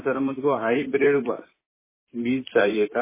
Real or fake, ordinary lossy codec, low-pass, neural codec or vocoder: fake; MP3, 16 kbps; 3.6 kHz; vocoder, 44.1 kHz, 128 mel bands, Pupu-Vocoder